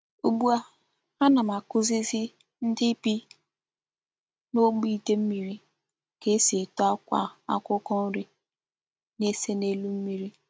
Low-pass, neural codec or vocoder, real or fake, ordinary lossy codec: none; none; real; none